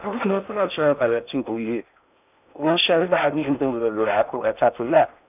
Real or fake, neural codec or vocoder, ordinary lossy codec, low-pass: fake; codec, 16 kHz in and 24 kHz out, 0.6 kbps, FocalCodec, streaming, 4096 codes; none; 3.6 kHz